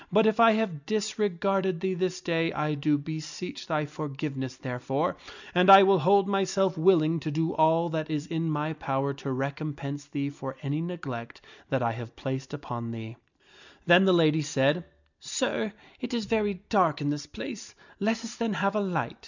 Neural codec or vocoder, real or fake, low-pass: none; real; 7.2 kHz